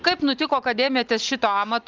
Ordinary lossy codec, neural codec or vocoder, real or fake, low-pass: Opus, 24 kbps; none; real; 7.2 kHz